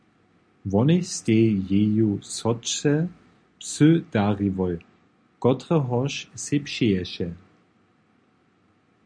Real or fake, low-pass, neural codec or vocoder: real; 9.9 kHz; none